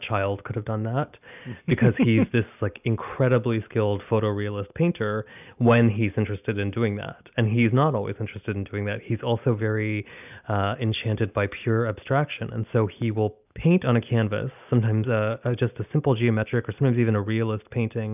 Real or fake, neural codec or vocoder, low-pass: real; none; 3.6 kHz